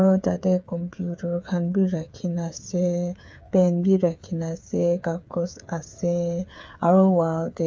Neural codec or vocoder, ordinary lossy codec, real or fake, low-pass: codec, 16 kHz, 8 kbps, FreqCodec, smaller model; none; fake; none